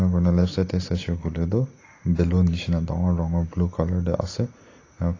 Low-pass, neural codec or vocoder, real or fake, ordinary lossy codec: 7.2 kHz; none; real; AAC, 32 kbps